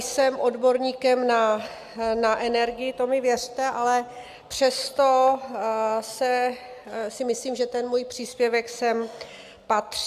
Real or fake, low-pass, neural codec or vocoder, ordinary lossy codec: real; 14.4 kHz; none; AAC, 96 kbps